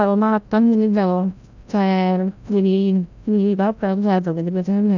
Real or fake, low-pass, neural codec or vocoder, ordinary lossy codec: fake; 7.2 kHz; codec, 16 kHz, 0.5 kbps, FreqCodec, larger model; none